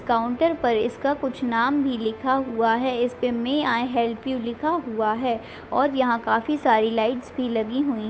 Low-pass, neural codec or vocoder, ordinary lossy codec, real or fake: none; codec, 16 kHz, 8 kbps, FunCodec, trained on Chinese and English, 25 frames a second; none; fake